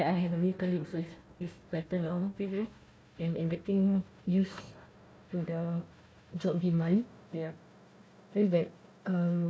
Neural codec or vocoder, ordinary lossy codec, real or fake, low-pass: codec, 16 kHz, 1 kbps, FunCodec, trained on Chinese and English, 50 frames a second; none; fake; none